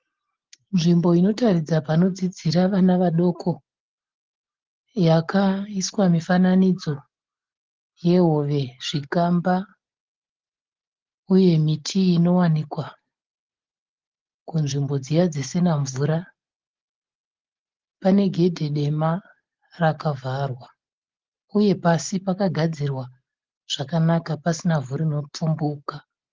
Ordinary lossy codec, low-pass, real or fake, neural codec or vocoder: Opus, 16 kbps; 7.2 kHz; real; none